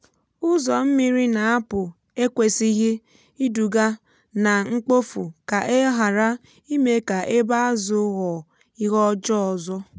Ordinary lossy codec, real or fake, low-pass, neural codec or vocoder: none; real; none; none